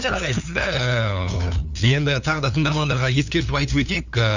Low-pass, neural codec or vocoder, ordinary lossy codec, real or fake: 7.2 kHz; codec, 16 kHz, 2 kbps, FunCodec, trained on LibriTTS, 25 frames a second; none; fake